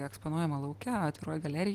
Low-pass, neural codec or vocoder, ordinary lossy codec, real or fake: 14.4 kHz; none; Opus, 24 kbps; real